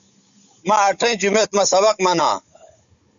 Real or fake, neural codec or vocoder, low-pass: fake; codec, 16 kHz, 16 kbps, FunCodec, trained on Chinese and English, 50 frames a second; 7.2 kHz